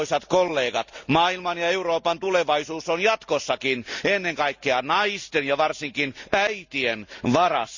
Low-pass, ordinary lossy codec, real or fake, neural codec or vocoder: 7.2 kHz; Opus, 64 kbps; real; none